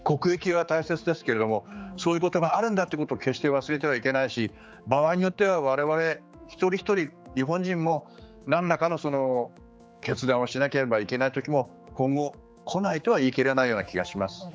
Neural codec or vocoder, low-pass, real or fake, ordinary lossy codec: codec, 16 kHz, 4 kbps, X-Codec, HuBERT features, trained on general audio; none; fake; none